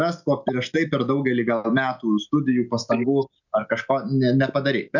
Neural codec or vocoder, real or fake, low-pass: none; real; 7.2 kHz